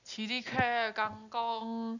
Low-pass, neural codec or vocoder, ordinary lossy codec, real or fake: 7.2 kHz; none; none; real